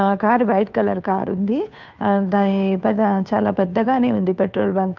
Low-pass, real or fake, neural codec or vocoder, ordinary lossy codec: 7.2 kHz; fake; codec, 16 kHz, 2 kbps, FunCodec, trained on Chinese and English, 25 frames a second; none